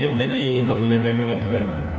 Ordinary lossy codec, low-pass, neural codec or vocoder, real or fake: none; none; codec, 16 kHz, 1 kbps, FunCodec, trained on LibriTTS, 50 frames a second; fake